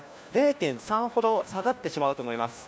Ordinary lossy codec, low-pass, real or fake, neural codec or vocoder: none; none; fake; codec, 16 kHz, 1 kbps, FunCodec, trained on LibriTTS, 50 frames a second